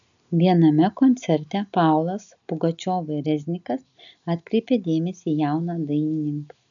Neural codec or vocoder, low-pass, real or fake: none; 7.2 kHz; real